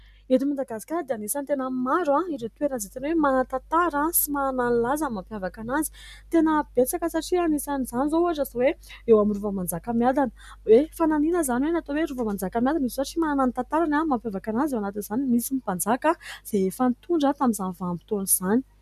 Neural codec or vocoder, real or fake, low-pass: none; real; 14.4 kHz